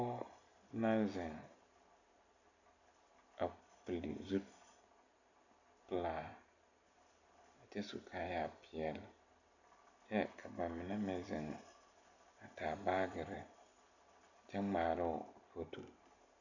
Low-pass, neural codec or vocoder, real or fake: 7.2 kHz; none; real